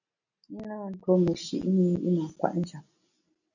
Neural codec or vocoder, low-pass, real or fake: none; 7.2 kHz; real